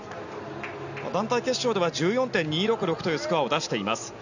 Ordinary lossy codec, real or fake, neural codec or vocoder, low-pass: none; real; none; 7.2 kHz